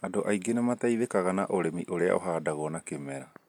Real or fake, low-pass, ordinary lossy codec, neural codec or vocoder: real; 19.8 kHz; MP3, 96 kbps; none